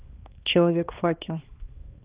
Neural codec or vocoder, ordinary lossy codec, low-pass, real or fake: codec, 16 kHz, 2 kbps, X-Codec, HuBERT features, trained on balanced general audio; Opus, 32 kbps; 3.6 kHz; fake